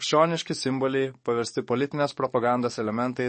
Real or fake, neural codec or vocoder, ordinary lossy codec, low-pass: fake; codec, 44.1 kHz, 7.8 kbps, DAC; MP3, 32 kbps; 10.8 kHz